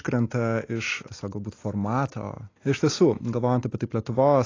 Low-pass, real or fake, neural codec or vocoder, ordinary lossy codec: 7.2 kHz; real; none; AAC, 32 kbps